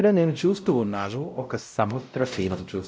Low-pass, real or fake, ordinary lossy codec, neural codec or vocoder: none; fake; none; codec, 16 kHz, 0.5 kbps, X-Codec, WavLM features, trained on Multilingual LibriSpeech